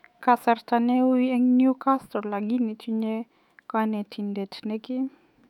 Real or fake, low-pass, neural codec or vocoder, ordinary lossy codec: fake; 19.8 kHz; autoencoder, 48 kHz, 128 numbers a frame, DAC-VAE, trained on Japanese speech; none